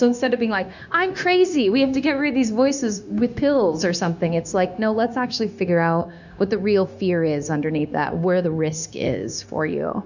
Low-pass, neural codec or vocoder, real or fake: 7.2 kHz; codec, 16 kHz, 0.9 kbps, LongCat-Audio-Codec; fake